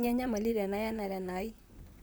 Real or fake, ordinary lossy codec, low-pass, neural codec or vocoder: fake; none; none; vocoder, 44.1 kHz, 128 mel bands, Pupu-Vocoder